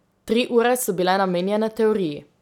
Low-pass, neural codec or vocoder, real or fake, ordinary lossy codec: 19.8 kHz; none; real; none